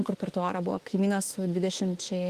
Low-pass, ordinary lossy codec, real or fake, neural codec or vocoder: 14.4 kHz; Opus, 24 kbps; fake; autoencoder, 48 kHz, 32 numbers a frame, DAC-VAE, trained on Japanese speech